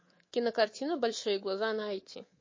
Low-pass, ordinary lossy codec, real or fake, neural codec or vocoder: 7.2 kHz; MP3, 32 kbps; fake; codec, 24 kHz, 3.1 kbps, DualCodec